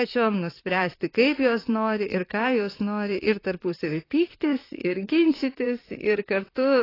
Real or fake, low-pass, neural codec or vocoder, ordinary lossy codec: fake; 5.4 kHz; vocoder, 44.1 kHz, 128 mel bands, Pupu-Vocoder; AAC, 24 kbps